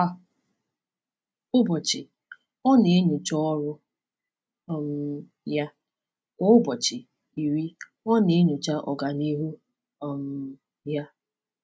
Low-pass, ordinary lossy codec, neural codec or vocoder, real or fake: none; none; none; real